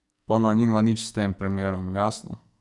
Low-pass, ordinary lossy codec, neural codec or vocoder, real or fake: 10.8 kHz; none; codec, 44.1 kHz, 2.6 kbps, SNAC; fake